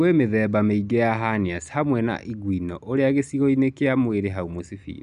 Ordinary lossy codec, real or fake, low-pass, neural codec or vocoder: none; real; 10.8 kHz; none